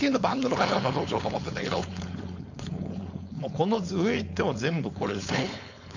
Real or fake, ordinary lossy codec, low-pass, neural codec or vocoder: fake; none; 7.2 kHz; codec, 16 kHz, 4.8 kbps, FACodec